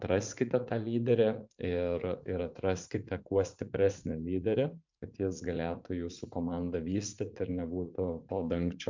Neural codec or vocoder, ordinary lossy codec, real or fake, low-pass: vocoder, 44.1 kHz, 80 mel bands, Vocos; MP3, 64 kbps; fake; 7.2 kHz